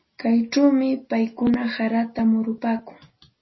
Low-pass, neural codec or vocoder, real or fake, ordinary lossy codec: 7.2 kHz; none; real; MP3, 24 kbps